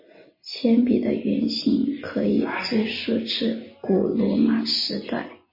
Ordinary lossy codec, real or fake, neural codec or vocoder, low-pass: MP3, 24 kbps; real; none; 5.4 kHz